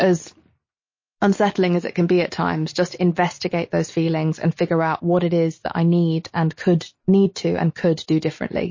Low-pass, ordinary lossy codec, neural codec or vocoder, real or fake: 7.2 kHz; MP3, 32 kbps; none; real